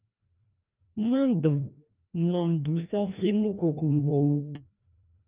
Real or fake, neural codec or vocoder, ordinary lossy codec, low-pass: fake; codec, 16 kHz, 1 kbps, FreqCodec, larger model; Opus, 24 kbps; 3.6 kHz